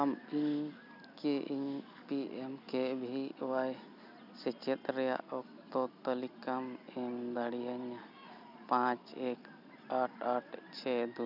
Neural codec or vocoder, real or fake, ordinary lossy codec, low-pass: none; real; none; 5.4 kHz